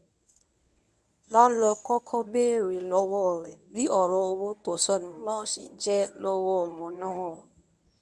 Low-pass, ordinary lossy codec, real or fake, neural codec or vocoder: none; none; fake; codec, 24 kHz, 0.9 kbps, WavTokenizer, medium speech release version 1